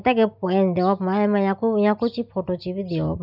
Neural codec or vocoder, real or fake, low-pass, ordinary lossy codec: none; real; 5.4 kHz; none